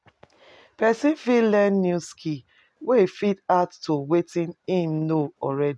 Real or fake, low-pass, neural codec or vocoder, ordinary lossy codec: real; none; none; none